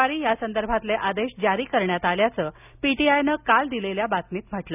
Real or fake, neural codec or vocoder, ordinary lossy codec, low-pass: real; none; none; 3.6 kHz